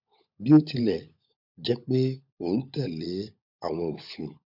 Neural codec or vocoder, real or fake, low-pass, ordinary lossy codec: codec, 16 kHz, 16 kbps, FunCodec, trained on LibriTTS, 50 frames a second; fake; 5.4 kHz; none